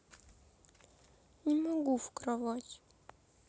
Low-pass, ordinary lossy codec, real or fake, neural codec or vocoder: none; none; real; none